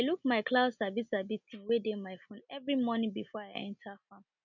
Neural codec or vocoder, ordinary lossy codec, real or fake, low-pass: none; none; real; 7.2 kHz